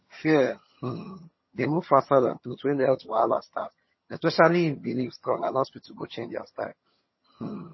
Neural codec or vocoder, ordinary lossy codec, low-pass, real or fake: vocoder, 22.05 kHz, 80 mel bands, HiFi-GAN; MP3, 24 kbps; 7.2 kHz; fake